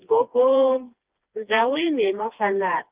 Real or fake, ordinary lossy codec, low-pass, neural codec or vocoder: fake; Opus, 32 kbps; 3.6 kHz; codec, 16 kHz, 2 kbps, FreqCodec, smaller model